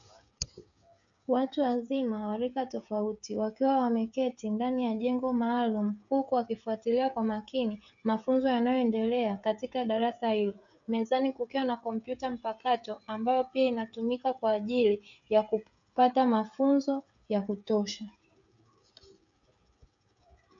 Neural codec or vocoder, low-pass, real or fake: codec, 16 kHz, 16 kbps, FreqCodec, smaller model; 7.2 kHz; fake